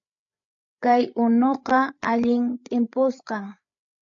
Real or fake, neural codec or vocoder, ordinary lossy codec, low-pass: fake; codec, 16 kHz, 8 kbps, FreqCodec, larger model; AAC, 64 kbps; 7.2 kHz